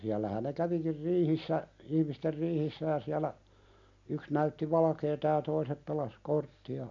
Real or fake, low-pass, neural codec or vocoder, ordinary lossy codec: real; 7.2 kHz; none; MP3, 48 kbps